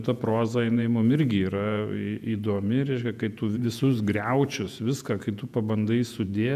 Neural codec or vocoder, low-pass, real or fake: vocoder, 48 kHz, 128 mel bands, Vocos; 14.4 kHz; fake